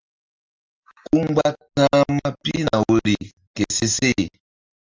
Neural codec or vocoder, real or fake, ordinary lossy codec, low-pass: none; real; Opus, 32 kbps; 7.2 kHz